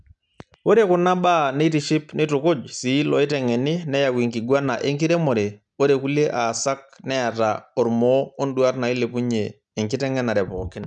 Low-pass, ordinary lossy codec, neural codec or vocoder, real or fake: 10.8 kHz; none; none; real